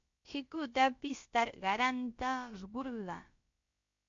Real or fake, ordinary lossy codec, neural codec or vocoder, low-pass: fake; MP3, 48 kbps; codec, 16 kHz, about 1 kbps, DyCAST, with the encoder's durations; 7.2 kHz